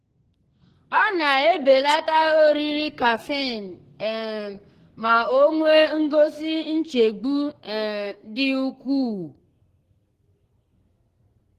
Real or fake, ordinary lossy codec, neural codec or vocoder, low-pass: fake; Opus, 16 kbps; codec, 44.1 kHz, 2.6 kbps, SNAC; 14.4 kHz